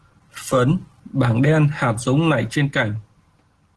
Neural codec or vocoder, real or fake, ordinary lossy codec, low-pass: none; real; Opus, 16 kbps; 10.8 kHz